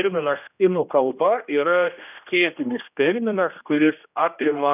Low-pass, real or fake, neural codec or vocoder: 3.6 kHz; fake; codec, 16 kHz, 1 kbps, X-Codec, HuBERT features, trained on general audio